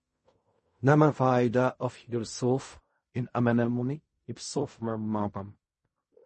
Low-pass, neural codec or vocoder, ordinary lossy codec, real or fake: 10.8 kHz; codec, 16 kHz in and 24 kHz out, 0.4 kbps, LongCat-Audio-Codec, fine tuned four codebook decoder; MP3, 32 kbps; fake